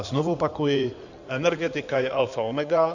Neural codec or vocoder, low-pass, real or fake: codec, 16 kHz in and 24 kHz out, 2.2 kbps, FireRedTTS-2 codec; 7.2 kHz; fake